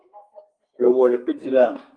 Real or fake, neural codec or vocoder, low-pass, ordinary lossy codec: fake; codec, 32 kHz, 1.9 kbps, SNAC; 9.9 kHz; Opus, 32 kbps